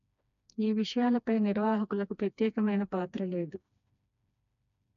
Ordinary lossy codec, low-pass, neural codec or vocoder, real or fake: AAC, 96 kbps; 7.2 kHz; codec, 16 kHz, 2 kbps, FreqCodec, smaller model; fake